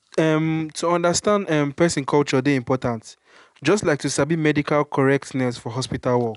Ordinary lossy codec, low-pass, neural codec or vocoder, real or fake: none; 10.8 kHz; none; real